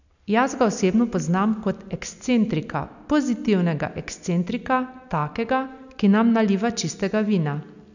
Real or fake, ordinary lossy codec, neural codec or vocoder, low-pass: real; none; none; 7.2 kHz